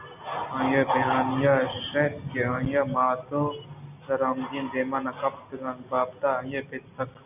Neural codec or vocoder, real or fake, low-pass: none; real; 3.6 kHz